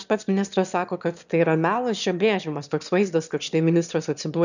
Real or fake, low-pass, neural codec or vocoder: fake; 7.2 kHz; autoencoder, 22.05 kHz, a latent of 192 numbers a frame, VITS, trained on one speaker